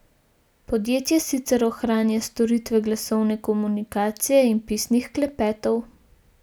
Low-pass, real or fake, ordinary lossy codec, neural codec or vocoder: none; real; none; none